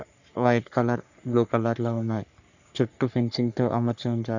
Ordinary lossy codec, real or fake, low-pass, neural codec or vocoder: none; fake; 7.2 kHz; codec, 44.1 kHz, 3.4 kbps, Pupu-Codec